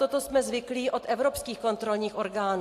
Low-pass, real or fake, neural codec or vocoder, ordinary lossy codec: 14.4 kHz; real; none; AAC, 64 kbps